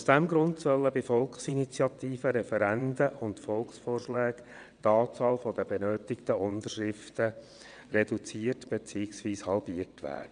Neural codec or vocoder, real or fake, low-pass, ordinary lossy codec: vocoder, 22.05 kHz, 80 mel bands, WaveNeXt; fake; 9.9 kHz; MP3, 96 kbps